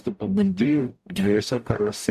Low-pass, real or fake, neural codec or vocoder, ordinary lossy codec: 14.4 kHz; fake; codec, 44.1 kHz, 0.9 kbps, DAC; MP3, 96 kbps